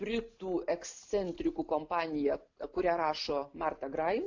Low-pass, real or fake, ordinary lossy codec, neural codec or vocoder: 7.2 kHz; real; AAC, 48 kbps; none